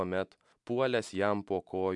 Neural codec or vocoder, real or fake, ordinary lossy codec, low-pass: none; real; MP3, 96 kbps; 10.8 kHz